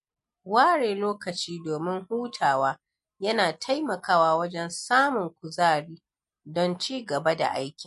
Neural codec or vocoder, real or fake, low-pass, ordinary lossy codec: none; real; 14.4 kHz; MP3, 48 kbps